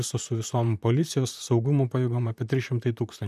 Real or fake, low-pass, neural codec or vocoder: fake; 14.4 kHz; vocoder, 44.1 kHz, 128 mel bands, Pupu-Vocoder